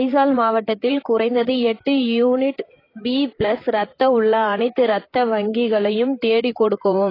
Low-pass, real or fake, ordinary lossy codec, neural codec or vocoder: 5.4 kHz; fake; AAC, 24 kbps; vocoder, 44.1 kHz, 128 mel bands, Pupu-Vocoder